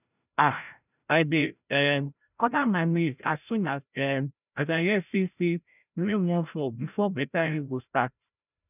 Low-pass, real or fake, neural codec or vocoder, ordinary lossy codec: 3.6 kHz; fake; codec, 16 kHz, 0.5 kbps, FreqCodec, larger model; none